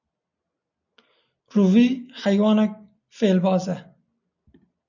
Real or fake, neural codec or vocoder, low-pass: real; none; 7.2 kHz